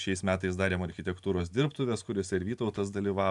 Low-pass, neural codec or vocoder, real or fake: 10.8 kHz; none; real